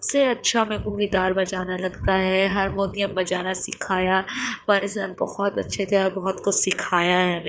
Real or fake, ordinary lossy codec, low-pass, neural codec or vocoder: fake; none; none; codec, 16 kHz, 4 kbps, FreqCodec, larger model